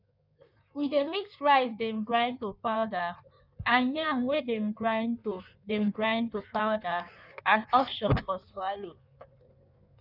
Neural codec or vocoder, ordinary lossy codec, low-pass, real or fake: codec, 16 kHz in and 24 kHz out, 1.1 kbps, FireRedTTS-2 codec; none; 5.4 kHz; fake